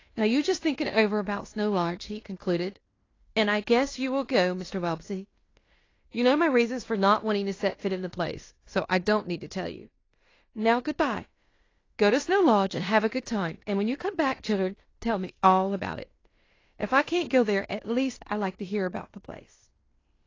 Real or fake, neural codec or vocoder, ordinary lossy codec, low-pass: fake; codec, 16 kHz in and 24 kHz out, 0.9 kbps, LongCat-Audio-Codec, fine tuned four codebook decoder; AAC, 32 kbps; 7.2 kHz